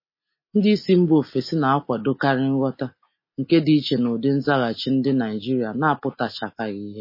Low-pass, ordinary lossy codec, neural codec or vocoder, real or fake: 5.4 kHz; MP3, 24 kbps; none; real